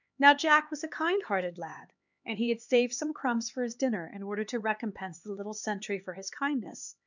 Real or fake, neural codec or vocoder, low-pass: fake; codec, 16 kHz, 4 kbps, X-Codec, HuBERT features, trained on LibriSpeech; 7.2 kHz